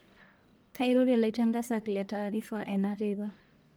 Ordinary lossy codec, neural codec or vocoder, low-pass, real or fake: none; codec, 44.1 kHz, 1.7 kbps, Pupu-Codec; none; fake